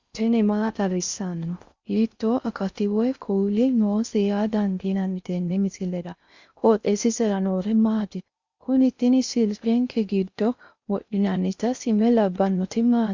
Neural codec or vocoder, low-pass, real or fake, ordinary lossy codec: codec, 16 kHz in and 24 kHz out, 0.6 kbps, FocalCodec, streaming, 4096 codes; 7.2 kHz; fake; Opus, 64 kbps